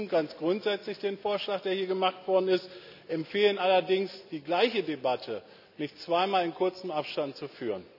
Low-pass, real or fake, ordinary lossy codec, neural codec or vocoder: 5.4 kHz; real; none; none